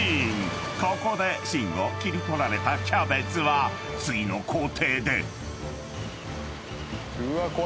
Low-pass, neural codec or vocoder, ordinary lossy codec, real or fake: none; none; none; real